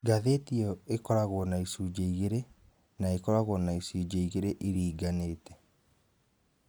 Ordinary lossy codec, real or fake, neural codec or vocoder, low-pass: none; real; none; none